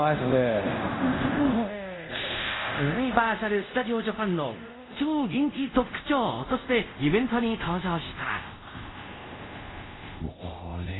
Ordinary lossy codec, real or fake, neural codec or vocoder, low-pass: AAC, 16 kbps; fake; codec, 24 kHz, 0.5 kbps, DualCodec; 7.2 kHz